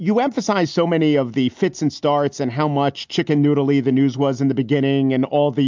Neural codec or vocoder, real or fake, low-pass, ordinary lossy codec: none; real; 7.2 kHz; MP3, 64 kbps